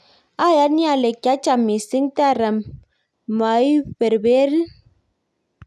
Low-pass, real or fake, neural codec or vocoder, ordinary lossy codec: none; real; none; none